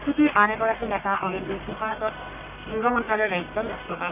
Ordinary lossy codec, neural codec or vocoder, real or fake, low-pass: none; codec, 44.1 kHz, 1.7 kbps, Pupu-Codec; fake; 3.6 kHz